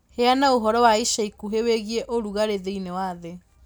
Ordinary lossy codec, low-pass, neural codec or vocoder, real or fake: none; none; none; real